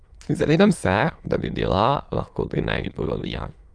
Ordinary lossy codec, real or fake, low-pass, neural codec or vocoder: Opus, 32 kbps; fake; 9.9 kHz; autoencoder, 22.05 kHz, a latent of 192 numbers a frame, VITS, trained on many speakers